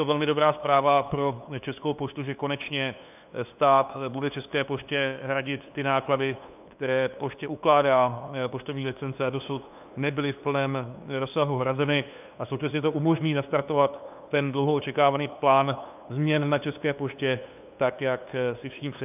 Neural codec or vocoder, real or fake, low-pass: codec, 16 kHz, 2 kbps, FunCodec, trained on LibriTTS, 25 frames a second; fake; 3.6 kHz